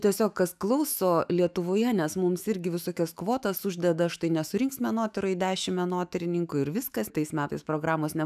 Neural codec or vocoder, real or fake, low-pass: autoencoder, 48 kHz, 128 numbers a frame, DAC-VAE, trained on Japanese speech; fake; 14.4 kHz